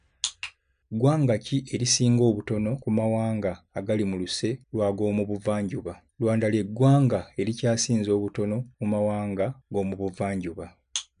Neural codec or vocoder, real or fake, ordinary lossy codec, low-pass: none; real; none; 9.9 kHz